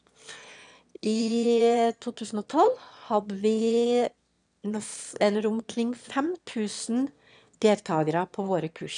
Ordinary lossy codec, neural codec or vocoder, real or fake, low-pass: none; autoencoder, 22.05 kHz, a latent of 192 numbers a frame, VITS, trained on one speaker; fake; 9.9 kHz